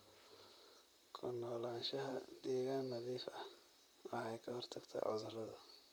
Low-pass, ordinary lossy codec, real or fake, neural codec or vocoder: none; none; fake; vocoder, 44.1 kHz, 128 mel bands, Pupu-Vocoder